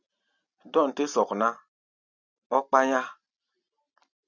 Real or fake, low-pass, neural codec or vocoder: real; 7.2 kHz; none